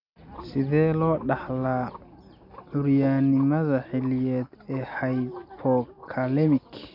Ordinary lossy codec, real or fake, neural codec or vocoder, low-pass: none; real; none; 5.4 kHz